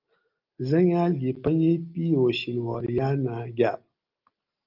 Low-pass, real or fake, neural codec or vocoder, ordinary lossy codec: 5.4 kHz; real; none; Opus, 24 kbps